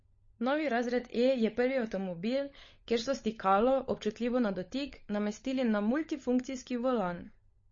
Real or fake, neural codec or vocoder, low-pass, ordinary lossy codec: fake; codec, 16 kHz, 16 kbps, FunCodec, trained on LibriTTS, 50 frames a second; 7.2 kHz; MP3, 32 kbps